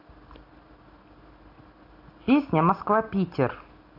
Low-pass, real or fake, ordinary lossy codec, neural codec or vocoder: 5.4 kHz; real; AAC, 32 kbps; none